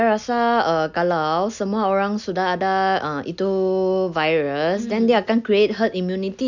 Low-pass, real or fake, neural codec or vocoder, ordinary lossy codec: 7.2 kHz; real; none; none